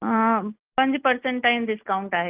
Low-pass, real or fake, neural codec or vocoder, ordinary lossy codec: 3.6 kHz; real; none; Opus, 32 kbps